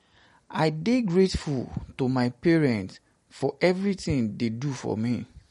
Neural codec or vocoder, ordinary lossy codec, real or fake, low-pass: none; MP3, 48 kbps; real; 19.8 kHz